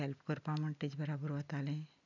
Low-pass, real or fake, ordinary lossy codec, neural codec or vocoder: 7.2 kHz; real; none; none